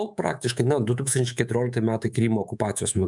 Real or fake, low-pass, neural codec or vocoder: fake; 10.8 kHz; codec, 24 kHz, 3.1 kbps, DualCodec